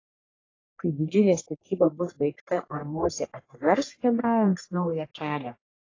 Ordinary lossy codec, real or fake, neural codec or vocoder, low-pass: AAC, 32 kbps; fake; codec, 44.1 kHz, 1.7 kbps, Pupu-Codec; 7.2 kHz